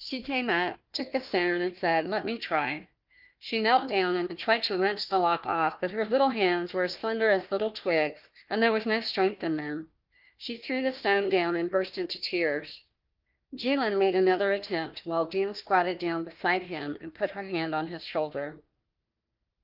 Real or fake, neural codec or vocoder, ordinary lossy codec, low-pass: fake; codec, 16 kHz, 1 kbps, FunCodec, trained on Chinese and English, 50 frames a second; Opus, 32 kbps; 5.4 kHz